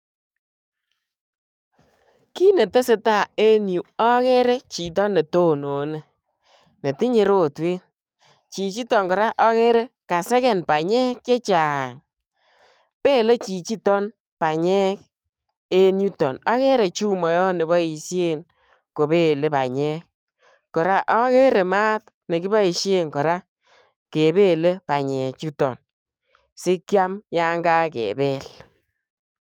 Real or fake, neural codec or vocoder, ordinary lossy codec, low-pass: fake; codec, 44.1 kHz, 7.8 kbps, DAC; none; 19.8 kHz